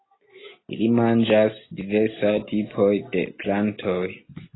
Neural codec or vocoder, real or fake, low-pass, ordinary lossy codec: none; real; 7.2 kHz; AAC, 16 kbps